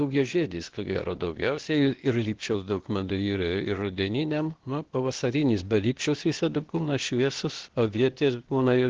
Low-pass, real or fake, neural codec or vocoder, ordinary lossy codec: 7.2 kHz; fake; codec, 16 kHz, 0.8 kbps, ZipCodec; Opus, 16 kbps